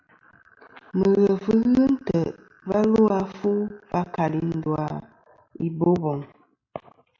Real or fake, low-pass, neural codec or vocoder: real; 7.2 kHz; none